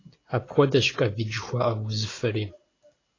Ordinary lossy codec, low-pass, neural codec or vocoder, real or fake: AAC, 32 kbps; 7.2 kHz; none; real